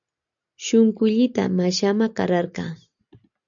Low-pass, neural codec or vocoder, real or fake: 7.2 kHz; none; real